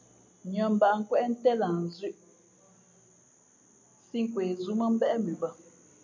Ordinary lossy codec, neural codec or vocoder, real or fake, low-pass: MP3, 64 kbps; none; real; 7.2 kHz